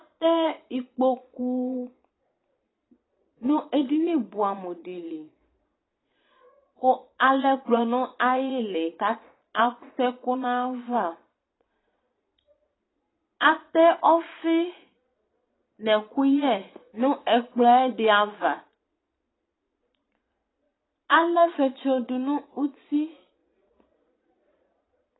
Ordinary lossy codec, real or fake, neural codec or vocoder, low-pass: AAC, 16 kbps; fake; vocoder, 22.05 kHz, 80 mel bands, Vocos; 7.2 kHz